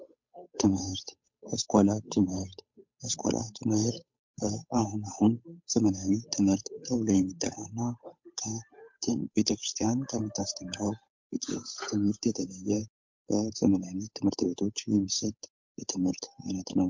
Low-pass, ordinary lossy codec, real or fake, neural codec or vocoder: 7.2 kHz; MP3, 48 kbps; fake; codec, 16 kHz, 8 kbps, FunCodec, trained on Chinese and English, 25 frames a second